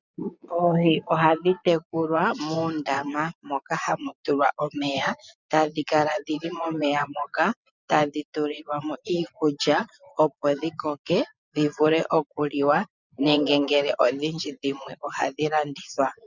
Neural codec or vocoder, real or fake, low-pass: vocoder, 24 kHz, 100 mel bands, Vocos; fake; 7.2 kHz